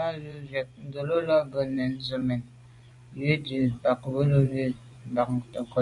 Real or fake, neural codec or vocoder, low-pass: fake; vocoder, 24 kHz, 100 mel bands, Vocos; 10.8 kHz